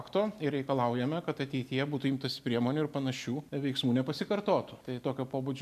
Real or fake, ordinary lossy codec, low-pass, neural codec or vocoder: real; MP3, 96 kbps; 14.4 kHz; none